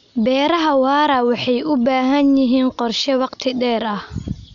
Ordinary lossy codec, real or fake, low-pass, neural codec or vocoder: none; real; 7.2 kHz; none